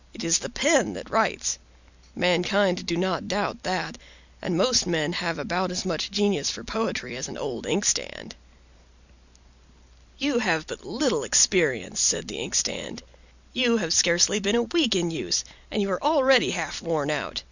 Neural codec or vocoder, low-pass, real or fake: none; 7.2 kHz; real